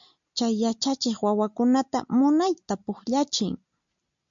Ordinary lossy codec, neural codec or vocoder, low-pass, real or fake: MP3, 64 kbps; none; 7.2 kHz; real